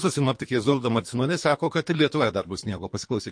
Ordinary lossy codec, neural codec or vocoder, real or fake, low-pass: MP3, 48 kbps; codec, 24 kHz, 3 kbps, HILCodec; fake; 9.9 kHz